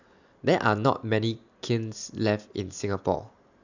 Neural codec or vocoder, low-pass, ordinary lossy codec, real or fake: none; 7.2 kHz; none; real